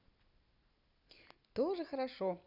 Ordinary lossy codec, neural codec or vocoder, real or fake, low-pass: none; none; real; 5.4 kHz